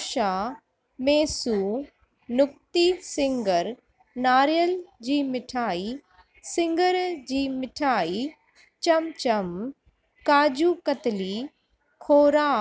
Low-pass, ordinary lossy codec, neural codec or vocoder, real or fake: none; none; none; real